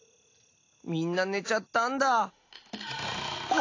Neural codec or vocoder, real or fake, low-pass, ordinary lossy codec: none; real; 7.2 kHz; AAC, 32 kbps